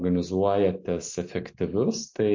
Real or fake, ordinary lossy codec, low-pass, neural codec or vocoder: real; MP3, 48 kbps; 7.2 kHz; none